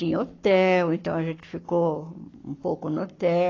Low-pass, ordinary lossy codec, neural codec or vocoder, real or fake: 7.2 kHz; AAC, 32 kbps; codec, 44.1 kHz, 7.8 kbps, Pupu-Codec; fake